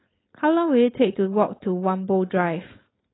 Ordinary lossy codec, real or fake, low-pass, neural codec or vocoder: AAC, 16 kbps; fake; 7.2 kHz; codec, 16 kHz, 4.8 kbps, FACodec